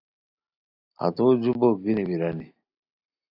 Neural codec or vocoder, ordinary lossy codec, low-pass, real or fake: none; AAC, 32 kbps; 5.4 kHz; real